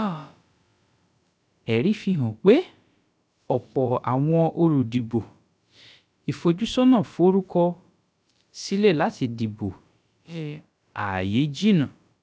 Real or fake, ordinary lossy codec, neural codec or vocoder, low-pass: fake; none; codec, 16 kHz, about 1 kbps, DyCAST, with the encoder's durations; none